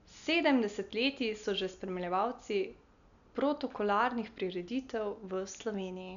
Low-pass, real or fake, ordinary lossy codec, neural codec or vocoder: 7.2 kHz; real; none; none